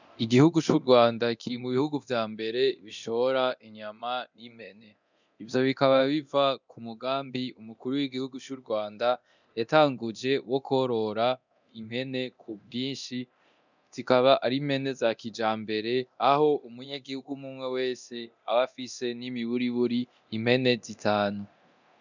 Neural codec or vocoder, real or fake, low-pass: codec, 24 kHz, 0.9 kbps, DualCodec; fake; 7.2 kHz